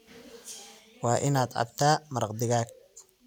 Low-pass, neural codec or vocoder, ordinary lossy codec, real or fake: 19.8 kHz; none; none; real